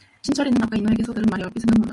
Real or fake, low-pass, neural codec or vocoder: real; 10.8 kHz; none